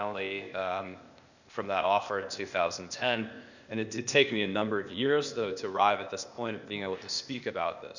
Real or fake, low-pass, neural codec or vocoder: fake; 7.2 kHz; codec, 16 kHz, 0.8 kbps, ZipCodec